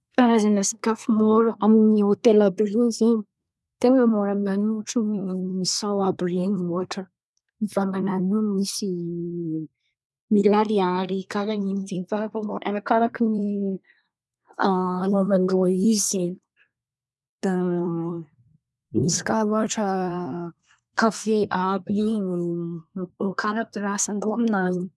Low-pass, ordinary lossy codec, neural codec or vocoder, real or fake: none; none; codec, 24 kHz, 1 kbps, SNAC; fake